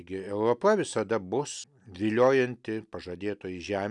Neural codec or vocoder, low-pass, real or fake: none; 10.8 kHz; real